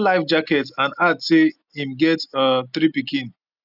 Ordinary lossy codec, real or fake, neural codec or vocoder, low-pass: none; real; none; 5.4 kHz